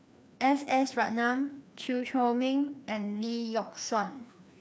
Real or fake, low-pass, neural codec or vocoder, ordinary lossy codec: fake; none; codec, 16 kHz, 2 kbps, FreqCodec, larger model; none